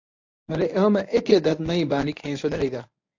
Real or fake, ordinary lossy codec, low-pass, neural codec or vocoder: fake; AAC, 48 kbps; 7.2 kHz; codec, 24 kHz, 0.9 kbps, WavTokenizer, medium speech release version 1